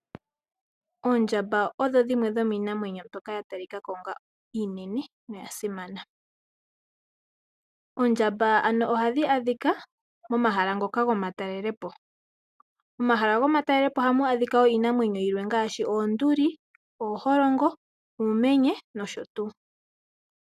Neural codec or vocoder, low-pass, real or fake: none; 14.4 kHz; real